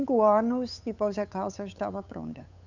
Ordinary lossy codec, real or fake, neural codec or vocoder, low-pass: none; fake; codec, 16 kHz, 8 kbps, FunCodec, trained on Chinese and English, 25 frames a second; 7.2 kHz